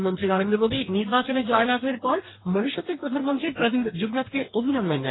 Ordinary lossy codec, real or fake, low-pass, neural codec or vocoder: AAC, 16 kbps; fake; 7.2 kHz; codec, 44.1 kHz, 2.6 kbps, DAC